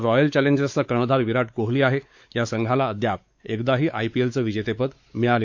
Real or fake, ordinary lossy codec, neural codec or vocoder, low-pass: fake; MP3, 48 kbps; codec, 16 kHz, 4 kbps, X-Codec, WavLM features, trained on Multilingual LibriSpeech; 7.2 kHz